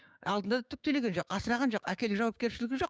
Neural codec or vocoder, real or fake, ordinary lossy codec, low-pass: codec, 16 kHz, 6 kbps, DAC; fake; none; none